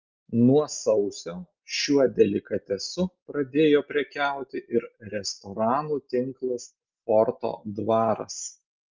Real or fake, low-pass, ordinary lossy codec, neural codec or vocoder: real; 7.2 kHz; Opus, 24 kbps; none